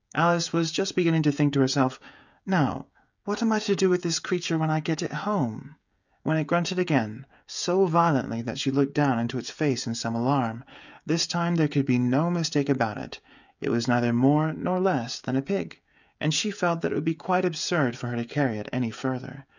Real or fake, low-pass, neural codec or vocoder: fake; 7.2 kHz; codec, 16 kHz, 16 kbps, FreqCodec, smaller model